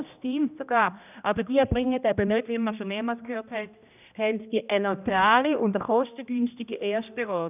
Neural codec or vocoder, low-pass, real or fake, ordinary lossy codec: codec, 16 kHz, 1 kbps, X-Codec, HuBERT features, trained on general audio; 3.6 kHz; fake; none